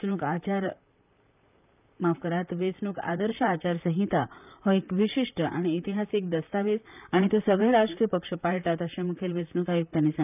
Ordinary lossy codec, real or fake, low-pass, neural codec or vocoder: none; fake; 3.6 kHz; vocoder, 44.1 kHz, 128 mel bands, Pupu-Vocoder